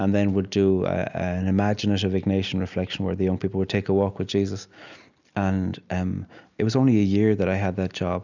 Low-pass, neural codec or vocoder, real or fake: 7.2 kHz; none; real